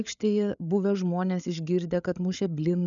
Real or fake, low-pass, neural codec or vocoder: fake; 7.2 kHz; codec, 16 kHz, 8 kbps, FreqCodec, larger model